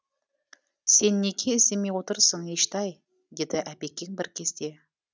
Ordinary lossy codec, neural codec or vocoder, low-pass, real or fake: none; none; none; real